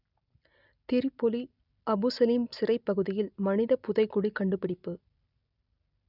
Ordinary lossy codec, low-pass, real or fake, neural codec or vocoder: none; 5.4 kHz; real; none